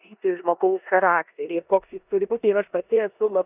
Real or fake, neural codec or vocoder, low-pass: fake; codec, 16 kHz in and 24 kHz out, 0.9 kbps, LongCat-Audio-Codec, four codebook decoder; 3.6 kHz